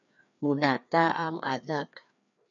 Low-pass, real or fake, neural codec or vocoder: 7.2 kHz; fake; codec, 16 kHz, 2 kbps, FreqCodec, larger model